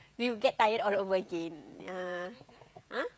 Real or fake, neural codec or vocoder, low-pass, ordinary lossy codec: fake; codec, 16 kHz, 16 kbps, FreqCodec, smaller model; none; none